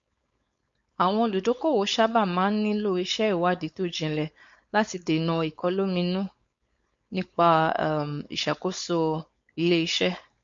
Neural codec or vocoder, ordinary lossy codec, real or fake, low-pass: codec, 16 kHz, 4.8 kbps, FACodec; MP3, 48 kbps; fake; 7.2 kHz